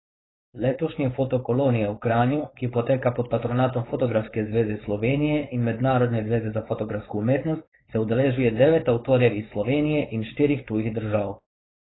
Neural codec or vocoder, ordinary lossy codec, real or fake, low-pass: codec, 16 kHz, 4.8 kbps, FACodec; AAC, 16 kbps; fake; 7.2 kHz